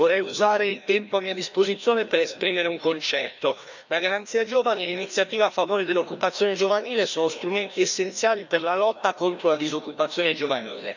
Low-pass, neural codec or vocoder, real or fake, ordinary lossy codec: 7.2 kHz; codec, 16 kHz, 1 kbps, FreqCodec, larger model; fake; none